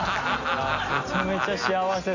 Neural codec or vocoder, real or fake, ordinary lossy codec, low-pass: none; real; Opus, 64 kbps; 7.2 kHz